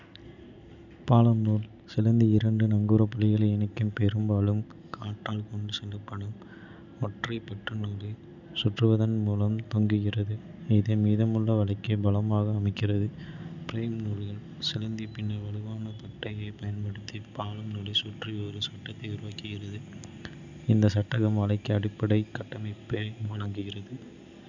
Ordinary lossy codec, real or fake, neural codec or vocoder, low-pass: none; real; none; 7.2 kHz